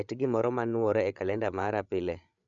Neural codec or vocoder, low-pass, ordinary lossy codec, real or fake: codec, 16 kHz, 16 kbps, FreqCodec, larger model; 7.2 kHz; none; fake